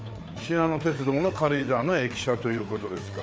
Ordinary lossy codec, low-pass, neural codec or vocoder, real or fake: none; none; codec, 16 kHz, 4 kbps, FreqCodec, larger model; fake